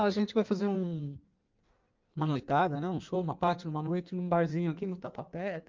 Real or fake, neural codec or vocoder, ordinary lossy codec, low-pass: fake; codec, 16 kHz in and 24 kHz out, 1.1 kbps, FireRedTTS-2 codec; Opus, 24 kbps; 7.2 kHz